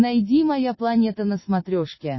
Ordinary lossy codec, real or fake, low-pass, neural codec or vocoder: MP3, 24 kbps; real; 7.2 kHz; none